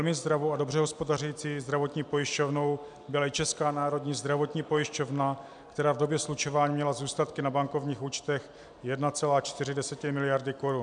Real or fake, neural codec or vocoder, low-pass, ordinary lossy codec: real; none; 9.9 kHz; MP3, 96 kbps